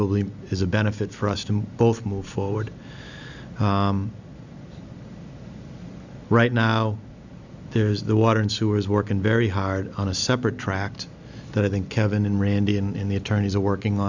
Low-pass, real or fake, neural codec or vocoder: 7.2 kHz; real; none